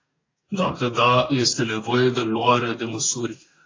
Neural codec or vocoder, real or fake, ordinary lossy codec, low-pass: codec, 44.1 kHz, 2.6 kbps, DAC; fake; AAC, 32 kbps; 7.2 kHz